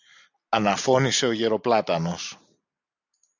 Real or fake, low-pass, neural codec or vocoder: real; 7.2 kHz; none